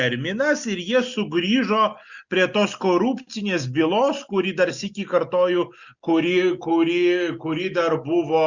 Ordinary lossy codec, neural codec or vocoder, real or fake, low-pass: Opus, 64 kbps; none; real; 7.2 kHz